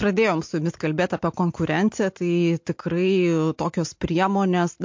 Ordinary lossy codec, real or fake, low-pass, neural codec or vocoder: MP3, 48 kbps; real; 7.2 kHz; none